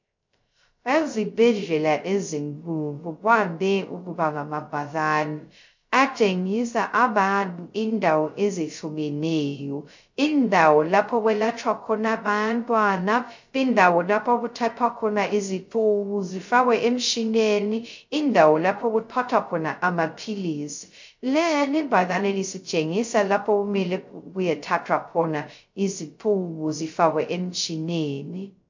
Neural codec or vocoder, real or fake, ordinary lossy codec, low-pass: codec, 16 kHz, 0.2 kbps, FocalCodec; fake; MP3, 48 kbps; 7.2 kHz